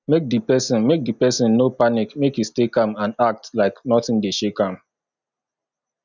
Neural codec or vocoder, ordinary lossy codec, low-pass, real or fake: none; none; 7.2 kHz; real